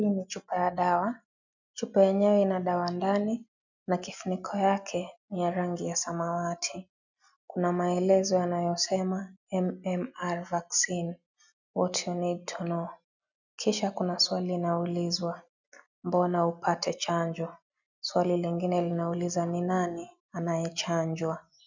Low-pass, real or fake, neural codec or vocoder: 7.2 kHz; real; none